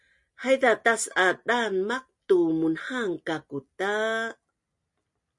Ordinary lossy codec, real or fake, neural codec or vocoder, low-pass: MP3, 48 kbps; real; none; 10.8 kHz